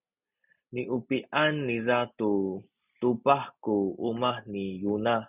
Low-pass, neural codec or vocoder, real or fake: 3.6 kHz; none; real